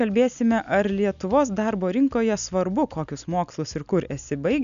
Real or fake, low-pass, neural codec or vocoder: real; 7.2 kHz; none